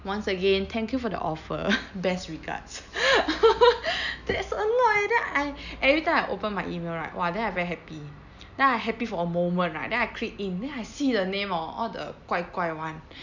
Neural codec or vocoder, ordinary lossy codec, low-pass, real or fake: none; none; 7.2 kHz; real